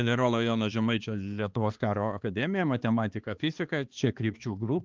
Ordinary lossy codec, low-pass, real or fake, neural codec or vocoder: Opus, 32 kbps; 7.2 kHz; fake; codec, 16 kHz, 2 kbps, X-Codec, HuBERT features, trained on balanced general audio